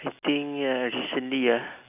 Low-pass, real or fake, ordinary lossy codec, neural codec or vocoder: 3.6 kHz; real; none; none